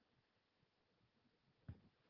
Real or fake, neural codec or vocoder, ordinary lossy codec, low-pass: fake; codec, 16 kHz, 4 kbps, FunCodec, trained on Chinese and English, 50 frames a second; Opus, 16 kbps; 5.4 kHz